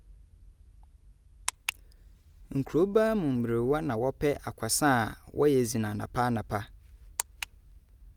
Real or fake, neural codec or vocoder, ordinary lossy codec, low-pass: real; none; Opus, 32 kbps; 19.8 kHz